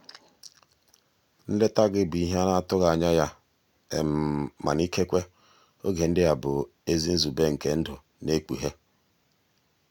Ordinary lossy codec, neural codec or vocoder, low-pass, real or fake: none; none; 19.8 kHz; real